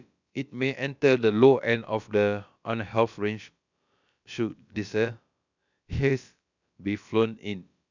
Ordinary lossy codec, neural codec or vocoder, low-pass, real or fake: none; codec, 16 kHz, about 1 kbps, DyCAST, with the encoder's durations; 7.2 kHz; fake